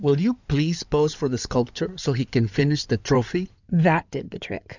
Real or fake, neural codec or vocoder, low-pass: fake; codec, 16 kHz in and 24 kHz out, 2.2 kbps, FireRedTTS-2 codec; 7.2 kHz